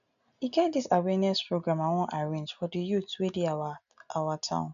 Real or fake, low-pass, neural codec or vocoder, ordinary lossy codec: real; 7.2 kHz; none; none